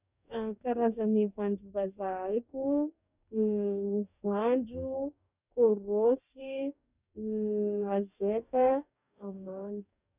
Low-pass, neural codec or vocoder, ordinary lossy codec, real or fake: 3.6 kHz; codec, 44.1 kHz, 2.6 kbps, DAC; none; fake